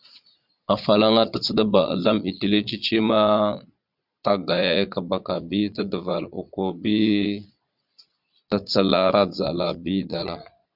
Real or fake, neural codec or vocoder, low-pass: fake; vocoder, 22.05 kHz, 80 mel bands, Vocos; 5.4 kHz